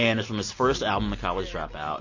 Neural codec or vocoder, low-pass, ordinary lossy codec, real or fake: none; 7.2 kHz; MP3, 32 kbps; real